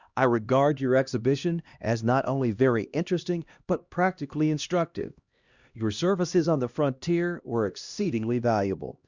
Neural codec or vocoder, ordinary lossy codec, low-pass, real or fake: codec, 16 kHz, 1 kbps, X-Codec, HuBERT features, trained on LibriSpeech; Opus, 64 kbps; 7.2 kHz; fake